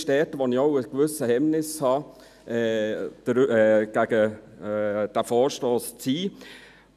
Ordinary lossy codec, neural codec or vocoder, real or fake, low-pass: none; none; real; 14.4 kHz